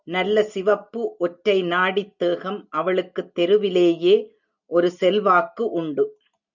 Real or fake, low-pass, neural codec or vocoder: real; 7.2 kHz; none